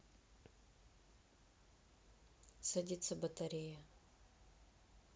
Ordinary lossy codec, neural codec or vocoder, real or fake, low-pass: none; none; real; none